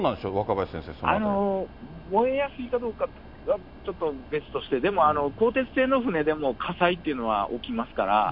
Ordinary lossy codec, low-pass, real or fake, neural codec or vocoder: none; 5.4 kHz; real; none